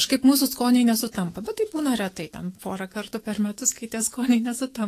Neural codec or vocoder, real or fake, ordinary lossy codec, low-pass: autoencoder, 48 kHz, 128 numbers a frame, DAC-VAE, trained on Japanese speech; fake; AAC, 48 kbps; 14.4 kHz